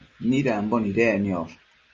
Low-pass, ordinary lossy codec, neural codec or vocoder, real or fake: 7.2 kHz; Opus, 32 kbps; none; real